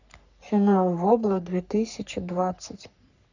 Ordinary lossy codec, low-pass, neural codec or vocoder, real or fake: none; 7.2 kHz; codec, 44.1 kHz, 3.4 kbps, Pupu-Codec; fake